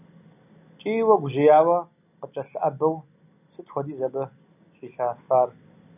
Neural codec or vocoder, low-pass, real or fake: none; 3.6 kHz; real